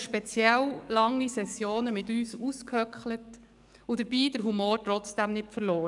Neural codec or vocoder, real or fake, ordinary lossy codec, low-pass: codec, 44.1 kHz, 7.8 kbps, DAC; fake; none; 10.8 kHz